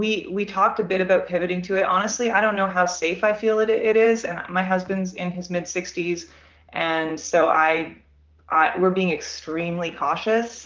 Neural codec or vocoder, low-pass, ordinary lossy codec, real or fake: vocoder, 44.1 kHz, 128 mel bands, Pupu-Vocoder; 7.2 kHz; Opus, 24 kbps; fake